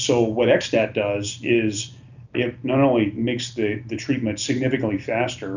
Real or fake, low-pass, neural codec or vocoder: real; 7.2 kHz; none